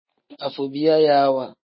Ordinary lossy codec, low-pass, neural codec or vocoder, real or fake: MP3, 24 kbps; 7.2 kHz; none; real